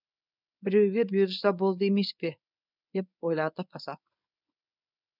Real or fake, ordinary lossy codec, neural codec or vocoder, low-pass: fake; none; codec, 24 kHz, 0.9 kbps, WavTokenizer, medium speech release version 1; 5.4 kHz